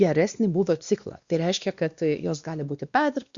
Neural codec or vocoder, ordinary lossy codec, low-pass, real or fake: codec, 16 kHz, 2 kbps, X-Codec, WavLM features, trained on Multilingual LibriSpeech; Opus, 64 kbps; 7.2 kHz; fake